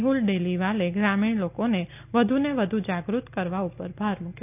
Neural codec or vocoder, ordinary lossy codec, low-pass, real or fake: none; none; 3.6 kHz; real